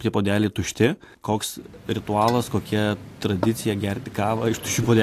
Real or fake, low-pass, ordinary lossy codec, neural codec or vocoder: real; 14.4 kHz; AAC, 64 kbps; none